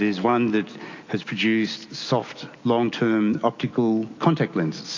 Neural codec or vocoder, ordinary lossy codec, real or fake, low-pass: none; AAC, 48 kbps; real; 7.2 kHz